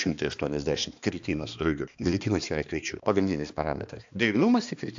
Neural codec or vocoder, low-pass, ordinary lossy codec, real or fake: codec, 16 kHz, 2 kbps, X-Codec, HuBERT features, trained on balanced general audio; 7.2 kHz; AAC, 64 kbps; fake